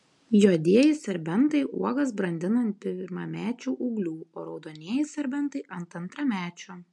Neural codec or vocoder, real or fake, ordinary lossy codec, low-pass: none; real; MP3, 64 kbps; 10.8 kHz